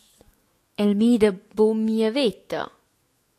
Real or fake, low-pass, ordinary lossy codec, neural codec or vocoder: fake; 14.4 kHz; AAC, 64 kbps; autoencoder, 48 kHz, 128 numbers a frame, DAC-VAE, trained on Japanese speech